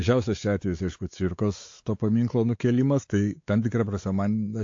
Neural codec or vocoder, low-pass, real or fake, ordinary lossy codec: codec, 16 kHz, 4 kbps, X-Codec, WavLM features, trained on Multilingual LibriSpeech; 7.2 kHz; fake; AAC, 48 kbps